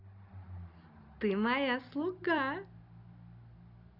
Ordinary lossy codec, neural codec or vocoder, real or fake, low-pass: none; none; real; 5.4 kHz